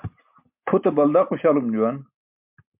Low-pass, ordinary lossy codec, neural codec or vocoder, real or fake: 3.6 kHz; MP3, 32 kbps; none; real